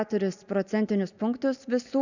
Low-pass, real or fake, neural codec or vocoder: 7.2 kHz; real; none